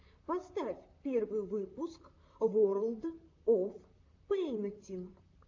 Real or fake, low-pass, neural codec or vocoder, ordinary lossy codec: fake; 7.2 kHz; vocoder, 44.1 kHz, 128 mel bands, Pupu-Vocoder; AAC, 48 kbps